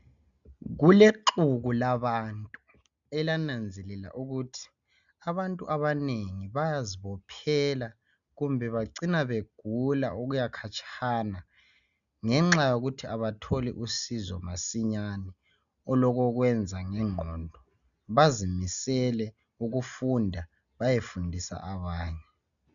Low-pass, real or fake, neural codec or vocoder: 7.2 kHz; real; none